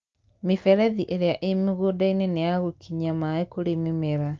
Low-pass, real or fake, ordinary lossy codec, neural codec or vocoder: 7.2 kHz; real; Opus, 24 kbps; none